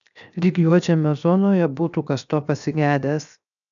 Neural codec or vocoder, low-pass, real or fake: codec, 16 kHz, 0.7 kbps, FocalCodec; 7.2 kHz; fake